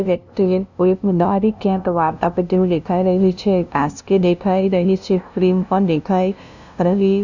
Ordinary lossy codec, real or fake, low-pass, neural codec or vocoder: none; fake; 7.2 kHz; codec, 16 kHz, 0.5 kbps, FunCodec, trained on LibriTTS, 25 frames a second